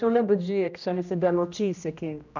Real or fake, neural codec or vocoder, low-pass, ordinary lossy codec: fake; codec, 16 kHz, 1 kbps, X-Codec, HuBERT features, trained on general audio; 7.2 kHz; none